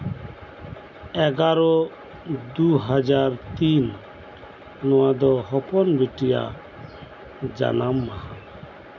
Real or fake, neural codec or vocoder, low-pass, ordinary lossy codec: real; none; 7.2 kHz; none